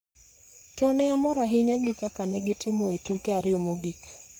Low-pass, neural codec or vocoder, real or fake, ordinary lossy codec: none; codec, 44.1 kHz, 3.4 kbps, Pupu-Codec; fake; none